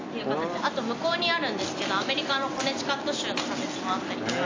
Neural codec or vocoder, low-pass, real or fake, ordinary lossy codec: none; 7.2 kHz; real; AAC, 32 kbps